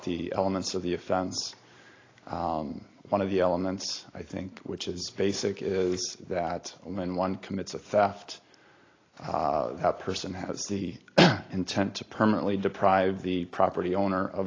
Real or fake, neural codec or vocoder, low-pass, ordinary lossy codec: real; none; 7.2 kHz; AAC, 32 kbps